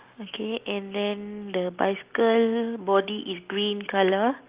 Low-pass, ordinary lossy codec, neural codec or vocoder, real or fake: 3.6 kHz; Opus, 32 kbps; none; real